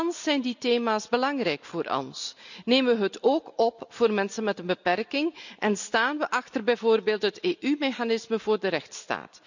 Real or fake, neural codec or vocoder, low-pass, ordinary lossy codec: real; none; 7.2 kHz; none